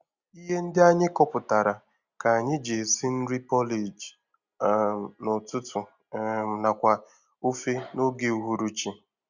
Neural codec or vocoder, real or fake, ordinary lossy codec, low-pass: none; real; Opus, 64 kbps; 7.2 kHz